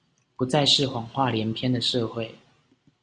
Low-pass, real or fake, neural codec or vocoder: 9.9 kHz; real; none